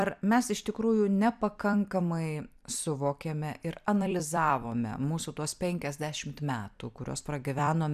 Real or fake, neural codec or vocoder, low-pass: fake; vocoder, 44.1 kHz, 128 mel bands every 256 samples, BigVGAN v2; 14.4 kHz